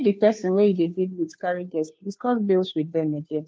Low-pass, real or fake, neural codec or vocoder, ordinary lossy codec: none; fake; codec, 16 kHz, 2 kbps, FunCodec, trained on Chinese and English, 25 frames a second; none